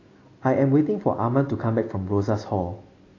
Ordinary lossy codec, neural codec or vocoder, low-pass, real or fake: AAC, 32 kbps; none; 7.2 kHz; real